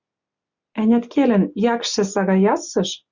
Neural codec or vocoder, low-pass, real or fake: none; 7.2 kHz; real